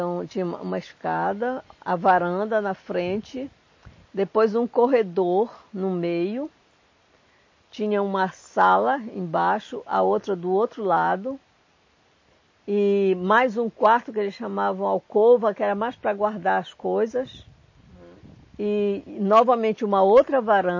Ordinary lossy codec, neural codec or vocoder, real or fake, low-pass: MP3, 32 kbps; none; real; 7.2 kHz